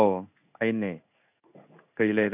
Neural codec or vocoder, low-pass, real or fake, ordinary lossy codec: codec, 16 kHz in and 24 kHz out, 1 kbps, XY-Tokenizer; 3.6 kHz; fake; none